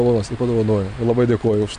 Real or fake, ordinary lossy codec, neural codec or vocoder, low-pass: real; MP3, 96 kbps; none; 9.9 kHz